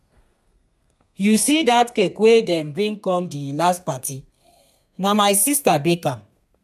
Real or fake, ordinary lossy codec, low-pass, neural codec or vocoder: fake; none; 14.4 kHz; codec, 32 kHz, 1.9 kbps, SNAC